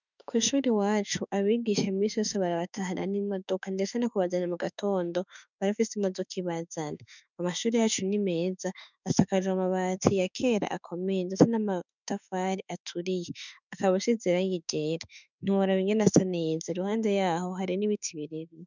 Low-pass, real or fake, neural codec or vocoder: 7.2 kHz; fake; autoencoder, 48 kHz, 32 numbers a frame, DAC-VAE, trained on Japanese speech